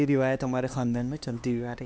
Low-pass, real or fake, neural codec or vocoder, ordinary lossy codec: none; fake; codec, 16 kHz, 2 kbps, X-Codec, HuBERT features, trained on LibriSpeech; none